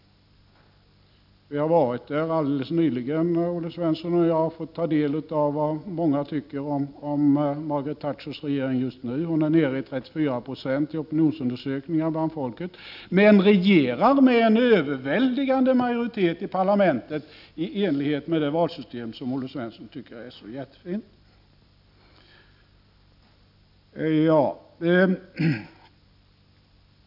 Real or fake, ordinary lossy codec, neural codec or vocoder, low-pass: real; none; none; 5.4 kHz